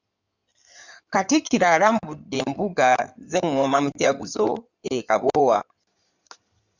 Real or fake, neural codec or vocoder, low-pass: fake; codec, 16 kHz in and 24 kHz out, 2.2 kbps, FireRedTTS-2 codec; 7.2 kHz